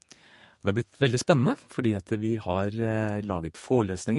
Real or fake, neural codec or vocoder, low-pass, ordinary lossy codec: fake; codec, 32 kHz, 1.9 kbps, SNAC; 14.4 kHz; MP3, 48 kbps